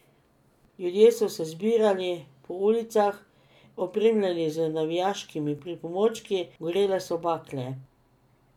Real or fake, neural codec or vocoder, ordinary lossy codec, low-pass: real; none; none; 19.8 kHz